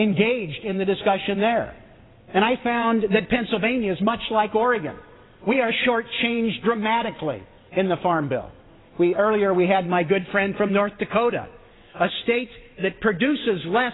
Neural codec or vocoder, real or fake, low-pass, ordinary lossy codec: vocoder, 22.05 kHz, 80 mel bands, WaveNeXt; fake; 7.2 kHz; AAC, 16 kbps